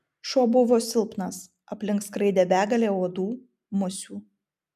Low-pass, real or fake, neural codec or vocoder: 14.4 kHz; real; none